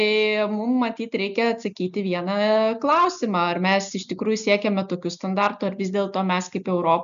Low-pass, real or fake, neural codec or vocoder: 7.2 kHz; real; none